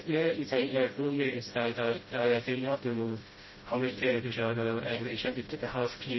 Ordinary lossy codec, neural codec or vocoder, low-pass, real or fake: MP3, 24 kbps; codec, 16 kHz, 0.5 kbps, FreqCodec, smaller model; 7.2 kHz; fake